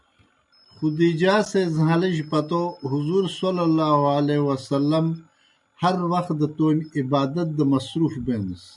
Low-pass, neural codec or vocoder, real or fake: 10.8 kHz; none; real